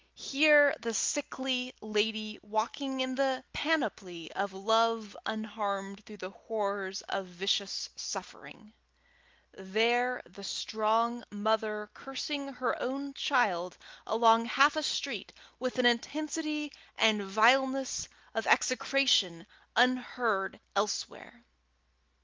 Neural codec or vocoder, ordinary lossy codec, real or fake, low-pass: none; Opus, 32 kbps; real; 7.2 kHz